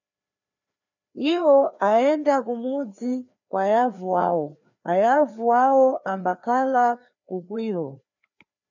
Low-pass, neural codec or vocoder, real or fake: 7.2 kHz; codec, 16 kHz, 2 kbps, FreqCodec, larger model; fake